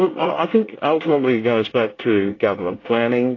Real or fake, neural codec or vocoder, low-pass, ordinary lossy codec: fake; codec, 24 kHz, 1 kbps, SNAC; 7.2 kHz; AAC, 32 kbps